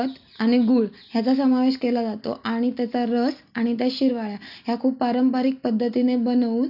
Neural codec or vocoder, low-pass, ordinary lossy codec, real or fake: none; 5.4 kHz; AAC, 48 kbps; real